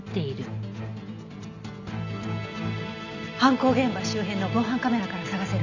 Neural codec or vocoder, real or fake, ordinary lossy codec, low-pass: none; real; none; 7.2 kHz